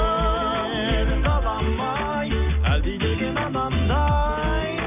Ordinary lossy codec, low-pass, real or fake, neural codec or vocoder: none; 3.6 kHz; real; none